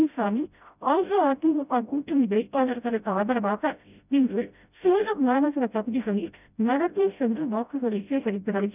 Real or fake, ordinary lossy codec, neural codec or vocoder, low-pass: fake; none; codec, 16 kHz, 0.5 kbps, FreqCodec, smaller model; 3.6 kHz